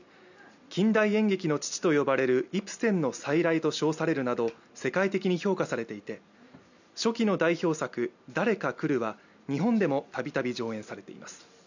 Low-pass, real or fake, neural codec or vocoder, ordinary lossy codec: 7.2 kHz; real; none; none